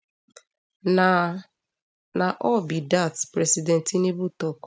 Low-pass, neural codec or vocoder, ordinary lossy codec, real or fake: none; none; none; real